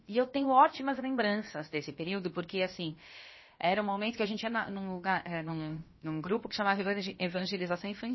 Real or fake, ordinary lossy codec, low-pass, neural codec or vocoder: fake; MP3, 24 kbps; 7.2 kHz; codec, 16 kHz, about 1 kbps, DyCAST, with the encoder's durations